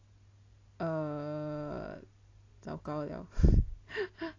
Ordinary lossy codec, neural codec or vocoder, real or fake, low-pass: AAC, 32 kbps; none; real; 7.2 kHz